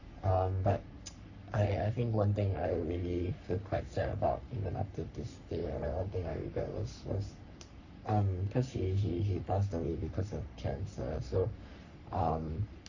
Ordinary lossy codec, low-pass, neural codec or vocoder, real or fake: none; 7.2 kHz; codec, 44.1 kHz, 3.4 kbps, Pupu-Codec; fake